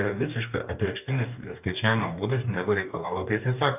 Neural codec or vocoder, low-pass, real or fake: codec, 44.1 kHz, 2.6 kbps, DAC; 3.6 kHz; fake